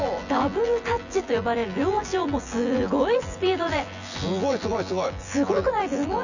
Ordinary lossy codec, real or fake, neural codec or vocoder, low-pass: none; fake; vocoder, 24 kHz, 100 mel bands, Vocos; 7.2 kHz